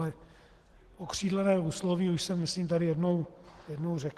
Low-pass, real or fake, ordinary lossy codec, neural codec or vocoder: 14.4 kHz; real; Opus, 16 kbps; none